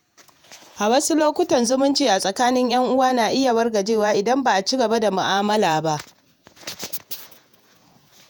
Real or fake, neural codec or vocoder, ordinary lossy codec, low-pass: fake; vocoder, 48 kHz, 128 mel bands, Vocos; none; none